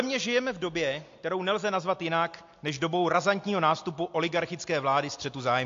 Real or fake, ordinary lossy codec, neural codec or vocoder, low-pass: real; AAC, 48 kbps; none; 7.2 kHz